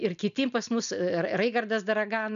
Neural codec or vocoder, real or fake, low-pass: none; real; 7.2 kHz